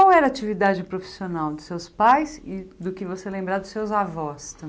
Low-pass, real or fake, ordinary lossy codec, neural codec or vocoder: none; real; none; none